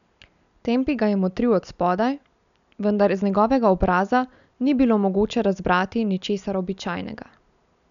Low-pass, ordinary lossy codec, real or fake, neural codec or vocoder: 7.2 kHz; none; real; none